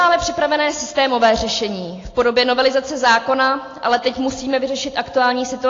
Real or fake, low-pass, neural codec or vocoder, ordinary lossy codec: real; 7.2 kHz; none; AAC, 32 kbps